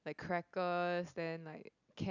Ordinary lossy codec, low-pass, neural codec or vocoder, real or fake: none; 7.2 kHz; none; real